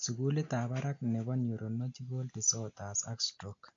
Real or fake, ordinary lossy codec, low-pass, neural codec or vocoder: real; AAC, 48 kbps; 7.2 kHz; none